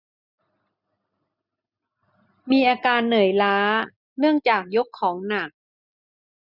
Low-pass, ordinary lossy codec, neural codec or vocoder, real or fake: 5.4 kHz; none; none; real